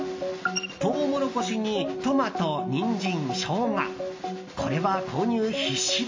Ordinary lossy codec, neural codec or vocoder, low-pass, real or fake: MP3, 32 kbps; none; 7.2 kHz; real